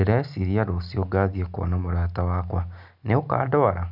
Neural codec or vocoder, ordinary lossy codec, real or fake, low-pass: none; none; real; 5.4 kHz